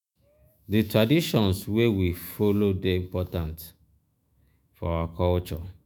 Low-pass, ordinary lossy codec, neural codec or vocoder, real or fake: none; none; autoencoder, 48 kHz, 128 numbers a frame, DAC-VAE, trained on Japanese speech; fake